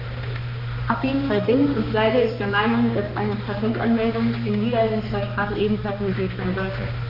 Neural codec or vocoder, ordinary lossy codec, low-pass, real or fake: codec, 16 kHz, 2 kbps, X-Codec, HuBERT features, trained on balanced general audio; none; 5.4 kHz; fake